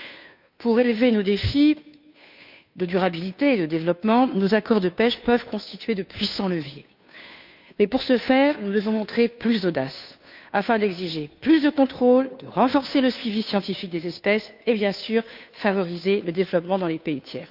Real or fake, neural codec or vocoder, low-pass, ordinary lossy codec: fake; codec, 16 kHz, 2 kbps, FunCodec, trained on Chinese and English, 25 frames a second; 5.4 kHz; none